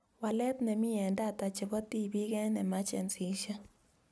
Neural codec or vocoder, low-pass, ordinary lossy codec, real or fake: none; none; none; real